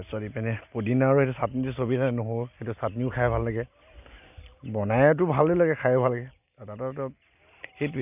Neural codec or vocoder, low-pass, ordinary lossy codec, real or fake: none; 3.6 kHz; none; real